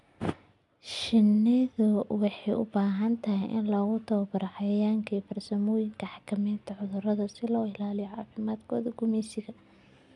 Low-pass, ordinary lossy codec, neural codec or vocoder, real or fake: 10.8 kHz; none; none; real